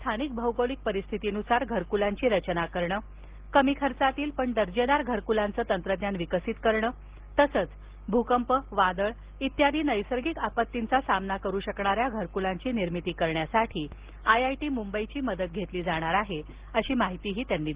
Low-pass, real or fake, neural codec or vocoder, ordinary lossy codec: 3.6 kHz; real; none; Opus, 16 kbps